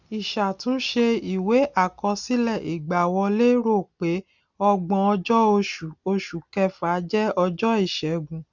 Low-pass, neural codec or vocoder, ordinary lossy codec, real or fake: 7.2 kHz; none; none; real